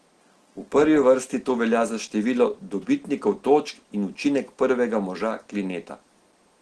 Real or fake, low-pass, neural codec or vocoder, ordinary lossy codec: real; 10.8 kHz; none; Opus, 16 kbps